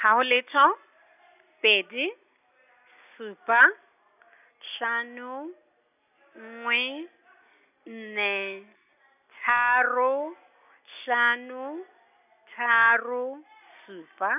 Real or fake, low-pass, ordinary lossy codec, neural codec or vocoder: real; 3.6 kHz; none; none